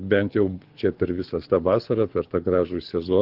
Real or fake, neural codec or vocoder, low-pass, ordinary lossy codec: fake; codec, 24 kHz, 6 kbps, HILCodec; 5.4 kHz; Opus, 16 kbps